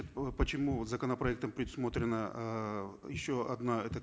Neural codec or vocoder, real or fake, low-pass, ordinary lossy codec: none; real; none; none